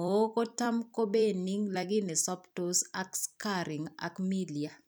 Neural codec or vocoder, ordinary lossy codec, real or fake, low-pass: vocoder, 44.1 kHz, 128 mel bands every 256 samples, BigVGAN v2; none; fake; none